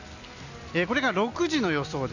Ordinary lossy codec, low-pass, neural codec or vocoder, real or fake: none; 7.2 kHz; none; real